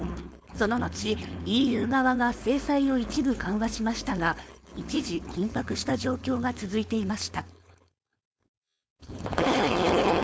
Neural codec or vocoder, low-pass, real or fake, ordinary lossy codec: codec, 16 kHz, 4.8 kbps, FACodec; none; fake; none